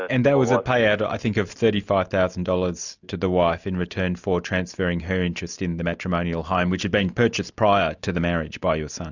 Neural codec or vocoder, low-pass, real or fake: none; 7.2 kHz; real